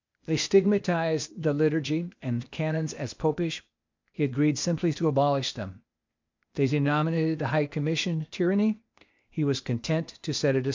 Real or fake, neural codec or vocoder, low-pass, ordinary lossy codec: fake; codec, 16 kHz, 0.8 kbps, ZipCodec; 7.2 kHz; MP3, 64 kbps